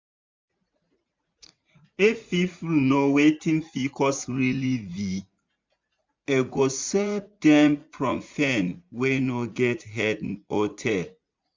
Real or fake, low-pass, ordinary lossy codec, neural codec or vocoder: fake; 7.2 kHz; MP3, 64 kbps; vocoder, 44.1 kHz, 80 mel bands, Vocos